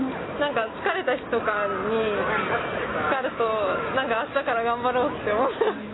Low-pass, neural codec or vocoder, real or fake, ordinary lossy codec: 7.2 kHz; none; real; AAC, 16 kbps